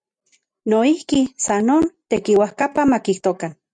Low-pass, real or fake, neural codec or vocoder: 9.9 kHz; real; none